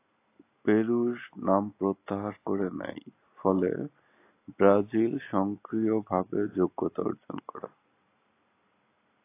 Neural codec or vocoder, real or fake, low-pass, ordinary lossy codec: none; real; 3.6 kHz; AAC, 24 kbps